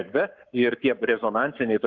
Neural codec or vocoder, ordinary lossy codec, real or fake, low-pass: codec, 44.1 kHz, 7.8 kbps, Pupu-Codec; Opus, 24 kbps; fake; 7.2 kHz